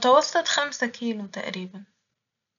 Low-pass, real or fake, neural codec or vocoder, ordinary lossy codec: 7.2 kHz; real; none; none